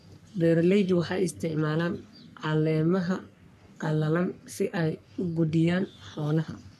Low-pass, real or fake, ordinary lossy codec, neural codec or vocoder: 14.4 kHz; fake; none; codec, 44.1 kHz, 3.4 kbps, Pupu-Codec